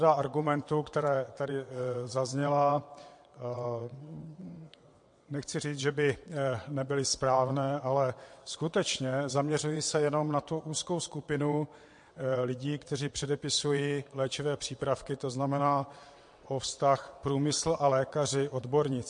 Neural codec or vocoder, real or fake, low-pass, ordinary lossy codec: vocoder, 22.05 kHz, 80 mel bands, WaveNeXt; fake; 9.9 kHz; MP3, 48 kbps